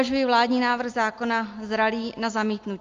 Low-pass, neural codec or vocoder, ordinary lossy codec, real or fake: 7.2 kHz; none; Opus, 32 kbps; real